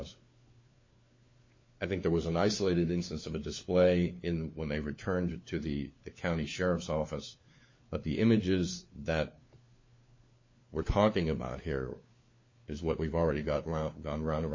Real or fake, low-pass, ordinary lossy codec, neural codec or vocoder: fake; 7.2 kHz; MP3, 32 kbps; codec, 16 kHz, 4 kbps, FunCodec, trained on LibriTTS, 50 frames a second